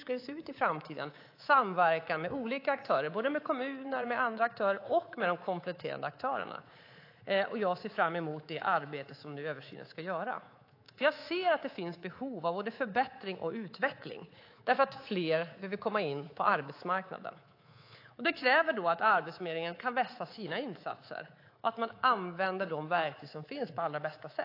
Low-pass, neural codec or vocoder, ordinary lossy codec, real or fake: 5.4 kHz; codec, 24 kHz, 3.1 kbps, DualCodec; AAC, 32 kbps; fake